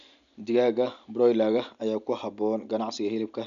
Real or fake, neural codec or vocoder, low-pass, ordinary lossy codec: real; none; 7.2 kHz; none